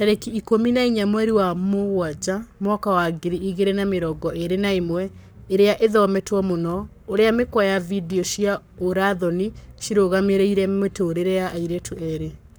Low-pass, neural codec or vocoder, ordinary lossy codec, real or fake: none; codec, 44.1 kHz, 7.8 kbps, Pupu-Codec; none; fake